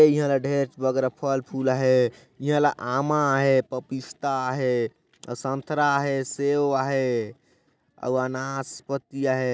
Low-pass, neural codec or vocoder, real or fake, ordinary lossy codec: none; none; real; none